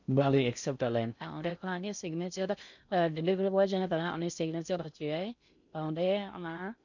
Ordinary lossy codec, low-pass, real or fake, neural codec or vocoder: none; 7.2 kHz; fake; codec, 16 kHz in and 24 kHz out, 0.6 kbps, FocalCodec, streaming, 2048 codes